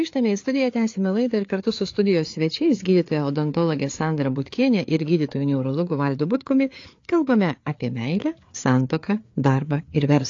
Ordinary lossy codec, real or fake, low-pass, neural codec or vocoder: AAC, 48 kbps; fake; 7.2 kHz; codec, 16 kHz, 4 kbps, FreqCodec, larger model